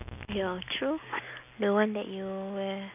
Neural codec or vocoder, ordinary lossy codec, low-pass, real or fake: none; none; 3.6 kHz; real